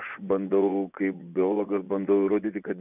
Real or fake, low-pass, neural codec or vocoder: fake; 3.6 kHz; vocoder, 24 kHz, 100 mel bands, Vocos